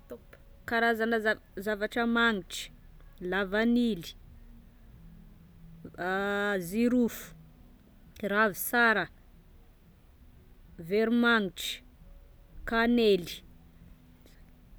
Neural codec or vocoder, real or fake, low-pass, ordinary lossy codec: none; real; none; none